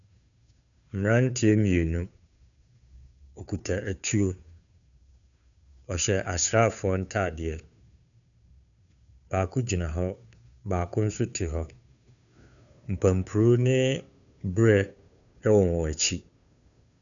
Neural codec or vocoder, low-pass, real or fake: codec, 16 kHz, 2 kbps, FunCodec, trained on Chinese and English, 25 frames a second; 7.2 kHz; fake